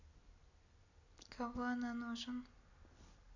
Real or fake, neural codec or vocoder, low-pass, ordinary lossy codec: real; none; 7.2 kHz; none